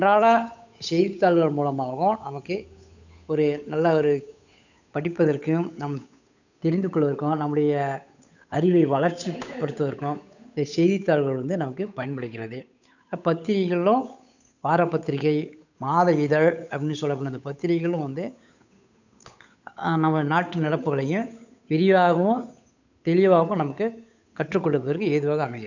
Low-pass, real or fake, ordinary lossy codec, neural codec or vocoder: 7.2 kHz; fake; none; codec, 16 kHz, 8 kbps, FunCodec, trained on Chinese and English, 25 frames a second